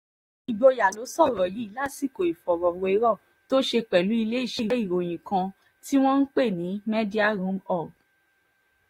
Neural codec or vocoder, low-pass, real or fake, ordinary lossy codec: vocoder, 44.1 kHz, 128 mel bands, Pupu-Vocoder; 19.8 kHz; fake; AAC, 48 kbps